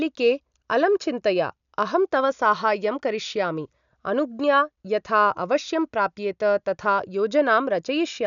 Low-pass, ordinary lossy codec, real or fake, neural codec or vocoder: 7.2 kHz; none; real; none